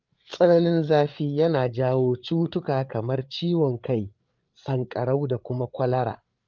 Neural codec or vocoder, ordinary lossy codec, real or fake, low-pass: codec, 16 kHz, 8 kbps, FreqCodec, larger model; Opus, 24 kbps; fake; 7.2 kHz